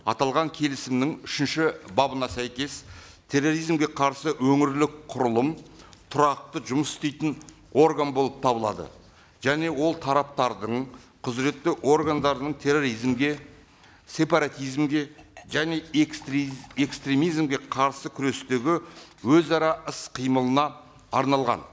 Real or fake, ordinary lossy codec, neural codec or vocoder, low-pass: real; none; none; none